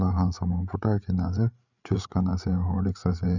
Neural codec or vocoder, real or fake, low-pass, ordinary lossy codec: codec, 16 kHz, 8 kbps, FreqCodec, larger model; fake; 7.2 kHz; none